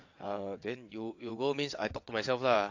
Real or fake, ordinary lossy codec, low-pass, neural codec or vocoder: fake; AAC, 48 kbps; 7.2 kHz; vocoder, 22.05 kHz, 80 mel bands, WaveNeXt